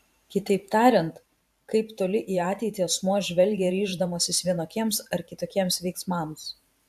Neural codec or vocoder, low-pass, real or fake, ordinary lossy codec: vocoder, 44.1 kHz, 128 mel bands every 512 samples, BigVGAN v2; 14.4 kHz; fake; AAC, 96 kbps